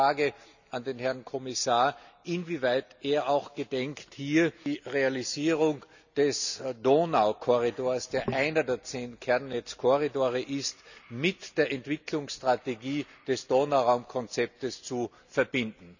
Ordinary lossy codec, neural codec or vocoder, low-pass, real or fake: none; none; 7.2 kHz; real